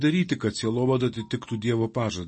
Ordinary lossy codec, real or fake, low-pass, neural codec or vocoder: MP3, 32 kbps; real; 10.8 kHz; none